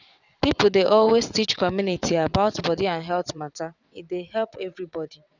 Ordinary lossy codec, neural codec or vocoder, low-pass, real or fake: none; vocoder, 22.05 kHz, 80 mel bands, WaveNeXt; 7.2 kHz; fake